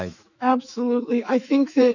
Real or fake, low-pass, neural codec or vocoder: fake; 7.2 kHz; autoencoder, 48 kHz, 128 numbers a frame, DAC-VAE, trained on Japanese speech